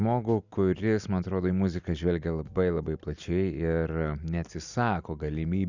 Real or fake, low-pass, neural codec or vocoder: real; 7.2 kHz; none